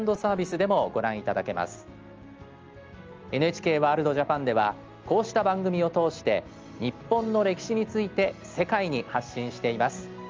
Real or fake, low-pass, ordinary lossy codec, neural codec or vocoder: real; 7.2 kHz; Opus, 24 kbps; none